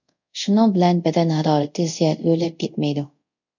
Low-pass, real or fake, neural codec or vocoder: 7.2 kHz; fake; codec, 24 kHz, 0.5 kbps, DualCodec